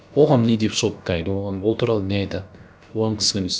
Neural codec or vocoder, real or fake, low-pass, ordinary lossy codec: codec, 16 kHz, about 1 kbps, DyCAST, with the encoder's durations; fake; none; none